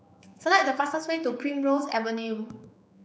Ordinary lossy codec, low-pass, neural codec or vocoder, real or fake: none; none; codec, 16 kHz, 4 kbps, X-Codec, HuBERT features, trained on general audio; fake